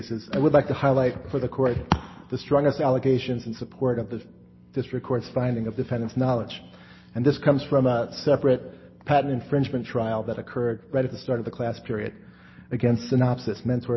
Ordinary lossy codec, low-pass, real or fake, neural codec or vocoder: MP3, 24 kbps; 7.2 kHz; real; none